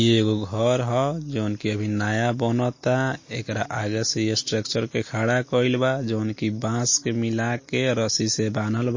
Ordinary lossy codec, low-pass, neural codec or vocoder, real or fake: MP3, 32 kbps; 7.2 kHz; none; real